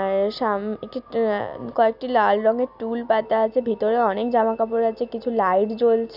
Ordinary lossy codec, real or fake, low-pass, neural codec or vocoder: none; real; 5.4 kHz; none